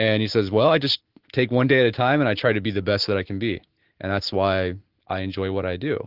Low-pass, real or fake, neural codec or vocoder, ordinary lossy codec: 5.4 kHz; real; none; Opus, 16 kbps